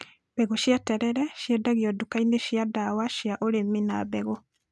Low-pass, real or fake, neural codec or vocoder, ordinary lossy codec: none; real; none; none